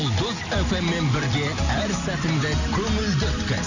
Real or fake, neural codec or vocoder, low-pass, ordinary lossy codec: real; none; 7.2 kHz; none